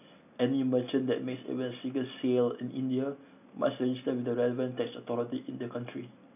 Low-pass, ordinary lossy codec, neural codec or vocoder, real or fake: 3.6 kHz; none; none; real